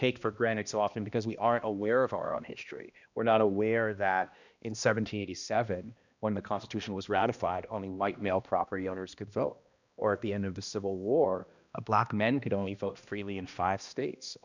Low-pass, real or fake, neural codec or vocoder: 7.2 kHz; fake; codec, 16 kHz, 1 kbps, X-Codec, HuBERT features, trained on balanced general audio